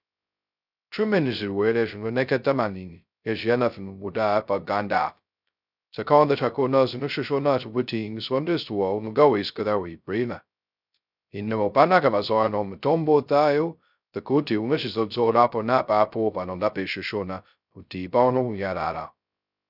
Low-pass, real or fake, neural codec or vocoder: 5.4 kHz; fake; codec, 16 kHz, 0.2 kbps, FocalCodec